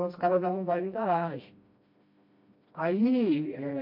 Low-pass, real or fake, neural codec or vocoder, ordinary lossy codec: 5.4 kHz; fake; codec, 16 kHz, 1 kbps, FreqCodec, smaller model; MP3, 32 kbps